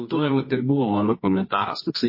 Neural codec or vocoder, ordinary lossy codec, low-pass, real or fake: codec, 24 kHz, 0.9 kbps, WavTokenizer, medium music audio release; MP3, 24 kbps; 5.4 kHz; fake